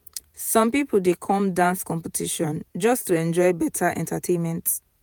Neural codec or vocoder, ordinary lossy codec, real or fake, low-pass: vocoder, 48 kHz, 128 mel bands, Vocos; none; fake; none